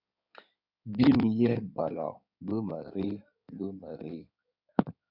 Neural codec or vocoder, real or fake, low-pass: codec, 16 kHz in and 24 kHz out, 2.2 kbps, FireRedTTS-2 codec; fake; 5.4 kHz